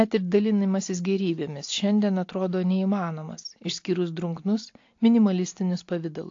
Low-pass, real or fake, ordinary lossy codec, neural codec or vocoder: 7.2 kHz; real; AAC, 48 kbps; none